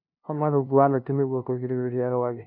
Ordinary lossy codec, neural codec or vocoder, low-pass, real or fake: none; codec, 16 kHz, 0.5 kbps, FunCodec, trained on LibriTTS, 25 frames a second; 5.4 kHz; fake